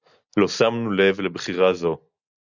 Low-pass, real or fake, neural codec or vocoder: 7.2 kHz; real; none